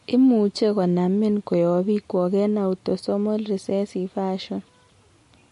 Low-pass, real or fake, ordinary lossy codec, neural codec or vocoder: 14.4 kHz; real; MP3, 48 kbps; none